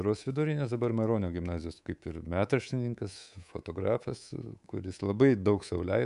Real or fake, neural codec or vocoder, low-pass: fake; codec, 24 kHz, 3.1 kbps, DualCodec; 10.8 kHz